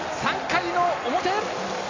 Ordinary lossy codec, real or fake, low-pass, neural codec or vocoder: AAC, 32 kbps; real; 7.2 kHz; none